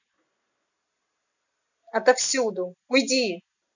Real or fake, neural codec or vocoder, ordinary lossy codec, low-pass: real; none; none; 7.2 kHz